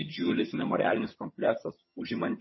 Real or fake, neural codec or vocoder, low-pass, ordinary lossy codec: fake; vocoder, 22.05 kHz, 80 mel bands, HiFi-GAN; 7.2 kHz; MP3, 24 kbps